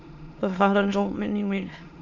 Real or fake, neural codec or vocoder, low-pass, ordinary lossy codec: fake; autoencoder, 22.05 kHz, a latent of 192 numbers a frame, VITS, trained on many speakers; 7.2 kHz; MP3, 64 kbps